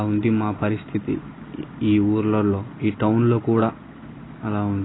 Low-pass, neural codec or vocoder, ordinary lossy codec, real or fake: 7.2 kHz; none; AAC, 16 kbps; real